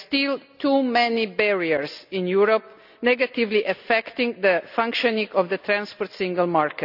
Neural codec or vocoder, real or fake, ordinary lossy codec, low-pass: none; real; none; 5.4 kHz